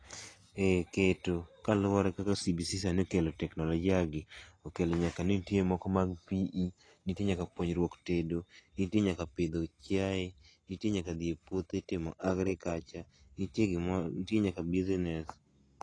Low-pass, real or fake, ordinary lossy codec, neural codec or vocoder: 9.9 kHz; real; AAC, 32 kbps; none